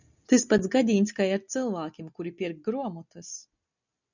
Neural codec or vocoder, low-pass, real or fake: none; 7.2 kHz; real